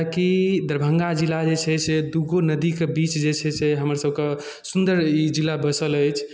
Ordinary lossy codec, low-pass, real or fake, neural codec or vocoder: none; none; real; none